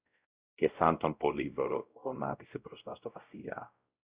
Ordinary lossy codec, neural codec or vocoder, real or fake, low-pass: Opus, 64 kbps; codec, 16 kHz, 0.5 kbps, X-Codec, WavLM features, trained on Multilingual LibriSpeech; fake; 3.6 kHz